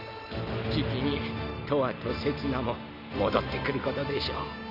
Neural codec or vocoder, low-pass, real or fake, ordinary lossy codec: none; 5.4 kHz; real; none